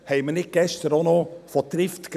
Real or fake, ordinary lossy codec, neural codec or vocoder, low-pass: fake; none; vocoder, 44.1 kHz, 128 mel bands every 256 samples, BigVGAN v2; 14.4 kHz